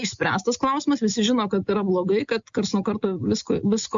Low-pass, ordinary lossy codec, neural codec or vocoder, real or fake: 7.2 kHz; MP3, 64 kbps; none; real